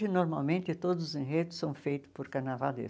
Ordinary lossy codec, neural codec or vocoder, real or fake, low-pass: none; none; real; none